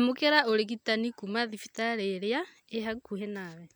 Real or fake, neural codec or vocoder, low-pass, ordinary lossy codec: real; none; none; none